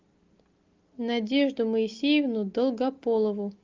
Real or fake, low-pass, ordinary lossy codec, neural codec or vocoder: real; 7.2 kHz; Opus, 24 kbps; none